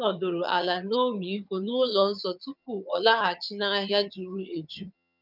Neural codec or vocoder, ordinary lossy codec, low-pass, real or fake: vocoder, 22.05 kHz, 80 mel bands, HiFi-GAN; none; 5.4 kHz; fake